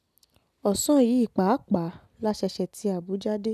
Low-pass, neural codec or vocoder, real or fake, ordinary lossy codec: 14.4 kHz; none; real; none